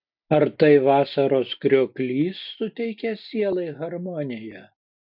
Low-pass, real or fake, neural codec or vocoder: 5.4 kHz; real; none